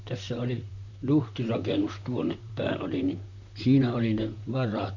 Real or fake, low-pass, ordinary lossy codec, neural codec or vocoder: fake; 7.2 kHz; none; vocoder, 44.1 kHz, 128 mel bands, Pupu-Vocoder